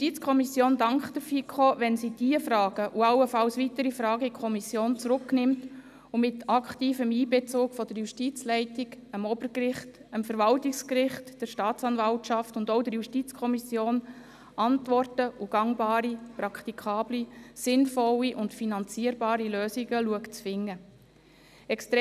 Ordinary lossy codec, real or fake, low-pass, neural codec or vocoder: none; real; 14.4 kHz; none